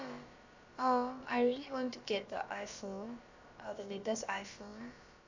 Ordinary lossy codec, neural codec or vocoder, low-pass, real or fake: AAC, 48 kbps; codec, 16 kHz, about 1 kbps, DyCAST, with the encoder's durations; 7.2 kHz; fake